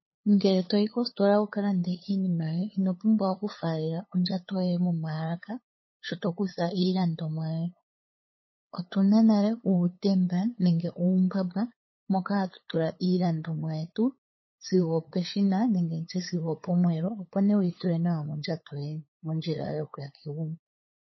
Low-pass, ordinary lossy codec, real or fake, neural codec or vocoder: 7.2 kHz; MP3, 24 kbps; fake; codec, 16 kHz, 8 kbps, FunCodec, trained on LibriTTS, 25 frames a second